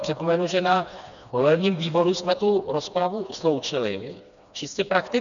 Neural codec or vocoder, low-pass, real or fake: codec, 16 kHz, 2 kbps, FreqCodec, smaller model; 7.2 kHz; fake